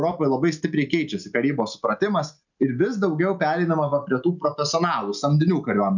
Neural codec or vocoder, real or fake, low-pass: none; real; 7.2 kHz